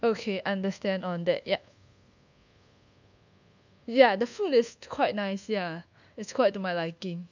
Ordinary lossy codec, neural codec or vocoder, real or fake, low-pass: none; codec, 24 kHz, 1.2 kbps, DualCodec; fake; 7.2 kHz